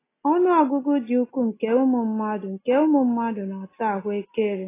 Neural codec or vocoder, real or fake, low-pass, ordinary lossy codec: none; real; 3.6 kHz; AAC, 16 kbps